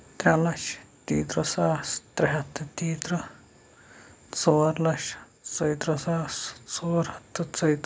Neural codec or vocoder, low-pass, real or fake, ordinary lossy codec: none; none; real; none